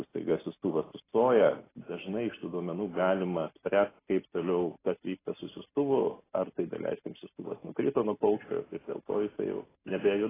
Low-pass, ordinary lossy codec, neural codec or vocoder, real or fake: 3.6 kHz; AAC, 16 kbps; none; real